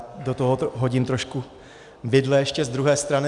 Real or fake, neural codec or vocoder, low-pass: real; none; 10.8 kHz